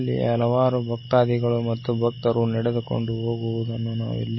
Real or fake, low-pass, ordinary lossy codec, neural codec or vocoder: real; 7.2 kHz; MP3, 24 kbps; none